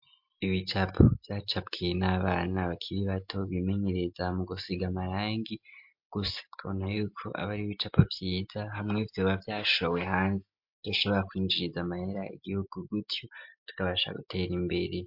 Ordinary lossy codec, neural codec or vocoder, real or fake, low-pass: MP3, 48 kbps; none; real; 5.4 kHz